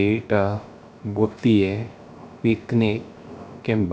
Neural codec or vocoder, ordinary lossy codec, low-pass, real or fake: codec, 16 kHz, 0.3 kbps, FocalCodec; none; none; fake